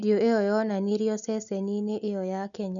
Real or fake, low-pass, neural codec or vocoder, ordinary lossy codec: real; 7.2 kHz; none; none